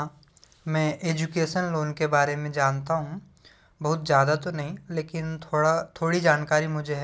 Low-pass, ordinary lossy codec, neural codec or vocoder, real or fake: none; none; none; real